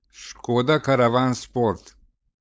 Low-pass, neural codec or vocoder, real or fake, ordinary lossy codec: none; codec, 16 kHz, 4.8 kbps, FACodec; fake; none